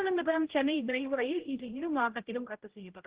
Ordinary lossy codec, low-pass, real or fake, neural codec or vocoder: Opus, 16 kbps; 3.6 kHz; fake; codec, 16 kHz, 0.5 kbps, X-Codec, HuBERT features, trained on general audio